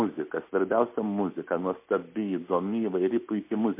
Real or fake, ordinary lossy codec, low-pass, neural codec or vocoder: real; MP3, 24 kbps; 3.6 kHz; none